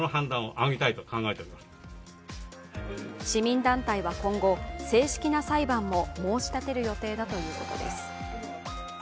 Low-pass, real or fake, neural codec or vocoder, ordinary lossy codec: none; real; none; none